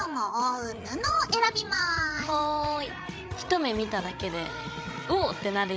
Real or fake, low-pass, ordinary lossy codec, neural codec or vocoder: fake; none; none; codec, 16 kHz, 16 kbps, FreqCodec, larger model